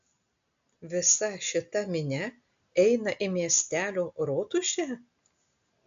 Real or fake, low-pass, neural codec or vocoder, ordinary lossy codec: real; 7.2 kHz; none; AAC, 64 kbps